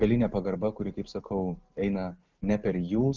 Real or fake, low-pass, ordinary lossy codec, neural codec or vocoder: real; 7.2 kHz; Opus, 32 kbps; none